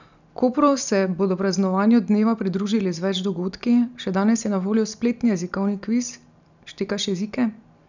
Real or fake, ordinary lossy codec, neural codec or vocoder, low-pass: real; none; none; 7.2 kHz